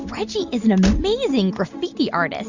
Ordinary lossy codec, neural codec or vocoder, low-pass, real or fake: Opus, 64 kbps; none; 7.2 kHz; real